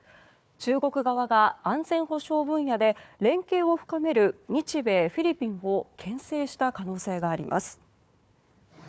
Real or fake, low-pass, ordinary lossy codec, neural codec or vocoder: fake; none; none; codec, 16 kHz, 4 kbps, FunCodec, trained on Chinese and English, 50 frames a second